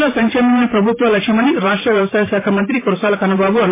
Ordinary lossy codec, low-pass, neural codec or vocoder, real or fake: MP3, 16 kbps; 3.6 kHz; vocoder, 44.1 kHz, 128 mel bands every 512 samples, BigVGAN v2; fake